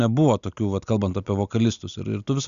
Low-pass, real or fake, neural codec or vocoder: 7.2 kHz; real; none